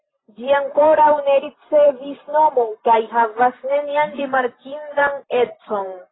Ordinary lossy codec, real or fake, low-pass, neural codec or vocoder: AAC, 16 kbps; fake; 7.2 kHz; vocoder, 44.1 kHz, 128 mel bands every 512 samples, BigVGAN v2